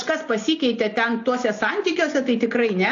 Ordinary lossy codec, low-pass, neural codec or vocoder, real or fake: AAC, 48 kbps; 7.2 kHz; none; real